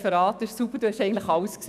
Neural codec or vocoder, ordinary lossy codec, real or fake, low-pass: autoencoder, 48 kHz, 128 numbers a frame, DAC-VAE, trained on Japanese speech; none; fake; 14.4 kHz